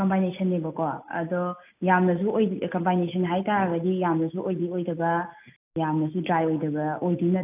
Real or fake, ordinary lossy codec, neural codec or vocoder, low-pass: real; AAC, 32 kbps; none; 3.6 kHz